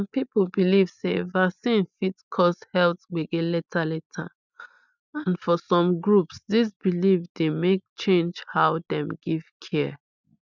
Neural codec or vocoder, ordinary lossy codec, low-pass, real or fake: none; none; 7.2 kHz; real